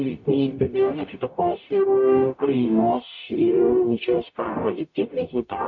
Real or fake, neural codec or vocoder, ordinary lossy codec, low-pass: fake; codec, 44.1 kHz, 0.9 kbps, DAC; MP3, 48 kbps; 7.2 kHz